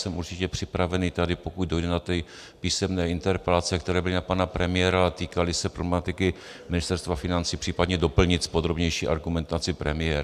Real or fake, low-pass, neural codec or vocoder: real; 14.4 kHz; none